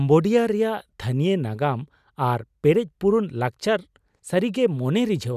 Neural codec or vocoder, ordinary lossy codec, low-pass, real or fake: none; none; 14.4 kHz; real